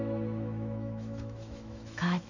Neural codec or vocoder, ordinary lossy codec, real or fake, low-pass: autoencoder, 48 kHz, 128 numbers a frame, DAC-VAE, trained on Japanese speech; none; fake; 7.2 kHz